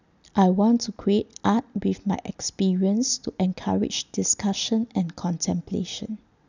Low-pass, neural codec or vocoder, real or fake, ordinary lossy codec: 7.2 kHz; none; real; none